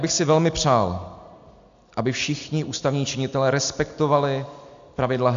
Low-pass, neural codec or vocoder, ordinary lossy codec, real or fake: 7.2 kHz; none; AAC, 64 kbps; real